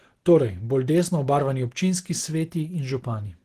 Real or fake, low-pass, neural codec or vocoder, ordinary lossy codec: real; 14.4 kHz; none; Opus, 16 kbps